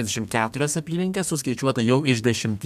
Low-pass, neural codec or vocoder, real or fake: 14.4 kHz; codec, 32 kHz, 1.9 kbps, SNAC; fake